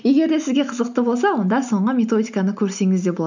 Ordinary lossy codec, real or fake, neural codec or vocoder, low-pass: none; real; none; 7.2 kHz